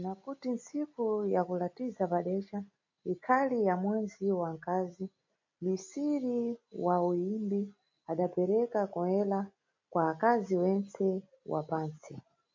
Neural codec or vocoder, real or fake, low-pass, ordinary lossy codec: none; real; 7.2 kHz; MP3, 48 kbps